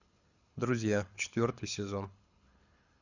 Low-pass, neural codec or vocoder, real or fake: 7.2 kHz; codec, 24 kHz, 6 kbps, HILCodec; fake